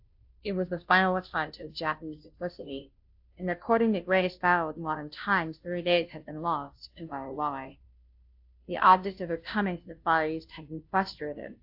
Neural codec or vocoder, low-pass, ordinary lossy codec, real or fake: codec, 16 kHz, 0.5 kbps, FunCodec, trained on Chinese and English, 25 frames a second; 5.4 kHz; AAC, 48 kbps; fake